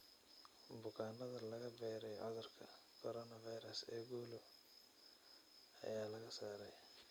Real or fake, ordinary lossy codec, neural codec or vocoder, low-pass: real; none; none; none